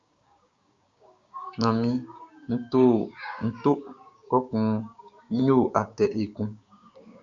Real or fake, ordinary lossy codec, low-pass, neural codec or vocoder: fake; Opus, 64 kbps; 7.2 kHz; codec, 16 kHz, 6 kbps, DAC